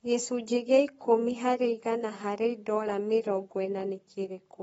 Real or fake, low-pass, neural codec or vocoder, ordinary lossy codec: fake; 19.8 kHz; codec, 44.1 kHz, 7.8 kbps, Pupu-Codec; AAC, 24 kbps